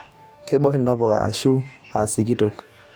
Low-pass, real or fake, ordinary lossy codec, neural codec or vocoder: none; fake; none; codec, 44.1 kHz, 2.6 kbps, DAC